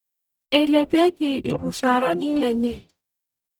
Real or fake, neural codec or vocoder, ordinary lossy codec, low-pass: fake; codec, 44.1 kHz, 0.9 kbps, DAC; none; none